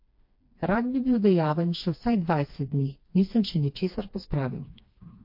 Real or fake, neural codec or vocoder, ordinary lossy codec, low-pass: fake; codec, 16 kHz, 2 kbps, FreqCodec, smaller model; MP3, 32 kbps; 5.4 kHz